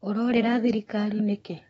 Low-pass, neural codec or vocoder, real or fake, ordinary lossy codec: 19.8 kHz; autoencoder, 48 kHz, 128 numbers a frame, DAC-VAE, trained on Japanese speech; fake; AAC, 24 kbps